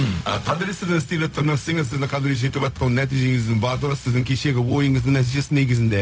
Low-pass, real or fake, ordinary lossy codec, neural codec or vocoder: none; fake; none; codec, 16 kHz, 0.4 kbps, LongCat-Audio-Codec